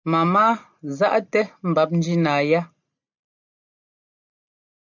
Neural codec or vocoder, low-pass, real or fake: none; 7.2 kHz; real